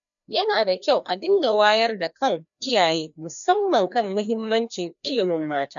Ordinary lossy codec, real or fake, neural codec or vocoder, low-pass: none; fake; codec, 16 kHz, 1 kbps, FreqCodec, larger model; 7.2 kHz